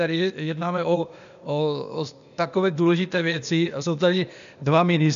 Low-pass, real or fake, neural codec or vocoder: 7.2 kHz; fake; codec, 16 kHz, 0.8 kbps, ZipCodec